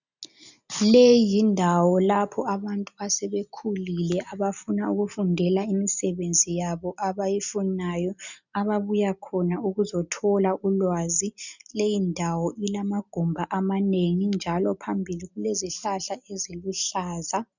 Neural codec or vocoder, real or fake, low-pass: none; real; 7.2 kHz